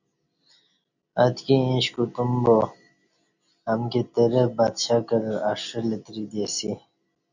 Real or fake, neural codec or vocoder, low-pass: real; none; 7.2 kHz